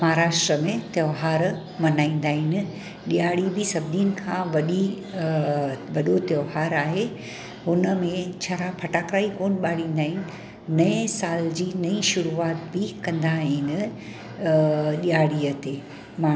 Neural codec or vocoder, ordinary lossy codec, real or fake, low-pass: none; none; real; none